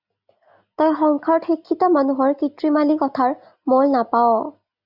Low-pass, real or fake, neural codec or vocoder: 5.4 kHz; real; none